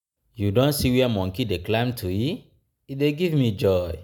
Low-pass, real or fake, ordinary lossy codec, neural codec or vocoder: none; real; none; none